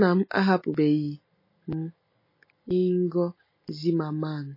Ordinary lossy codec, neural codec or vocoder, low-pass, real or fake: MP3, 24 kbps; none; 5.4 kHz; real